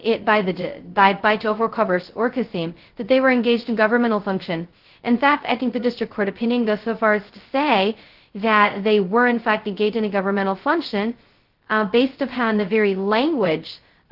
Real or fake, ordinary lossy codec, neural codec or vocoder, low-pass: fake; Opus, 16 kbps; codec, 16 kHz, 0.2 kbps, FocalCodec; 5.4 kHz